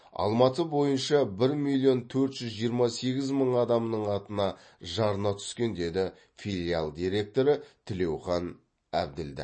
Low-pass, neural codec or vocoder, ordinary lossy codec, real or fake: 9.9 kHz; none; MP3, 32 kbps; real